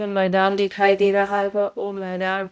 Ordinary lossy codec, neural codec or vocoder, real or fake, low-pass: none; codec, 16 kHz, 0.5 kbps, X-Codec, HuBERT features, trained on balanced general audio; fake; none